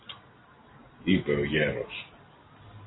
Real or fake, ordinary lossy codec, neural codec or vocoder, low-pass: real; AAC, 16 kbps; none; 7.2 kHz